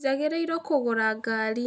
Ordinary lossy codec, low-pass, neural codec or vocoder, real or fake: none; none; none; real